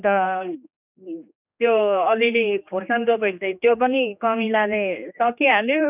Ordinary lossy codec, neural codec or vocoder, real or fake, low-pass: none; codec, 16 kHz, 2 kbps, X-Codec, HuBERT features, trained on general audio; fake; 3.6 kHz